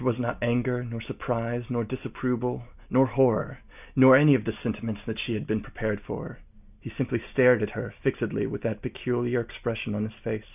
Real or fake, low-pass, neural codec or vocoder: real; 3.6 kHz; none